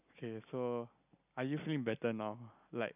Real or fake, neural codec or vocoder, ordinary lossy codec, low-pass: real; none; none; 3.6 kHz